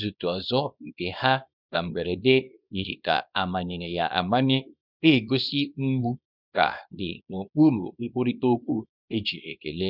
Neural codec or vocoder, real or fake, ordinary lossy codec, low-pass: codec, 24 kHz, 0.9 kbps, WavTokenizer, small release; fake; none; 5.4 kHz